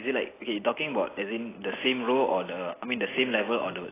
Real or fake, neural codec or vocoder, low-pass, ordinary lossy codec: real; none; 3.6 kHz; AAC, 16 kbps